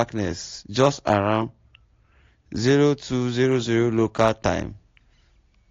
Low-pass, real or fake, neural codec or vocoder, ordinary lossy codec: 7.2 kHz; real; none; AAC, 48 kbps